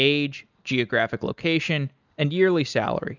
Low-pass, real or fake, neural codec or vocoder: 7.2 kHz; real; none